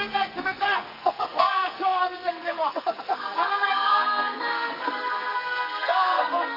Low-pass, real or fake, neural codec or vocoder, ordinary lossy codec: 5.4 kHz; fake; codec, 44.1 kHz, 2.6 kbps, SNAC; none